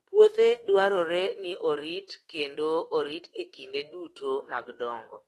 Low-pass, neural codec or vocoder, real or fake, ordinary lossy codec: 19.8 kHz; autoencoder, 48 kHz, 32 numbers a frame, DAC-VAE, trained on Japanese speech; fake; AAC, 32 kbps